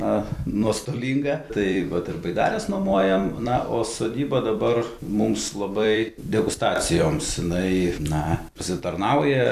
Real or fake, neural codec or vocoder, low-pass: fake; vocoder, 44.1 kHz, 128 mel bands every 256 samples, BigVGAN v2; 14.4 kHz